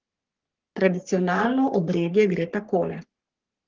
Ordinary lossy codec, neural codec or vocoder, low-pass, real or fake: Opus, 16 kbps; codec, 44.1 kHz, 3.4 kbps, Pupu-Codec; 7.2 kHz; fake